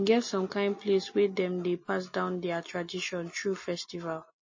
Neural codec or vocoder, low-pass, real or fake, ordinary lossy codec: none; 7.2 kHz; real; MP3, 32 kbps